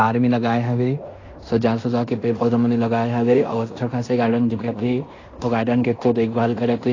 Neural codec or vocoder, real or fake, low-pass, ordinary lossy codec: codec, 16 kHz in and 24 kHz out, 0.9 kbps, LongCat-Audio-Codec, fine tuned four codebook decoder; fake; 7.2 kHz; AAC, 32 kbps